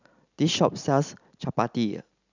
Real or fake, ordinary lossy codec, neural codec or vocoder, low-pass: real; none; none; 7.2 kHz